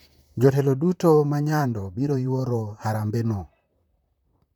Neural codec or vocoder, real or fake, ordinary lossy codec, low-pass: vocoder, 44.1 kHz, 128 mel bands, Pupu-Vocoder; fake; none; 19.8 kHz